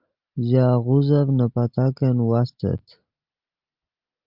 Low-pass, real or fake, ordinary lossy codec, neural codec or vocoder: 5.4 kHz; real; Opus, 32 kbps; none